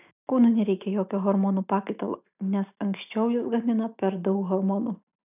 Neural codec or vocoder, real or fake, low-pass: none; real; 3.6 kHz